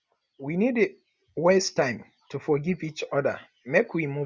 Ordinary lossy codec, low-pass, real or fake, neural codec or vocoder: none; none; real; none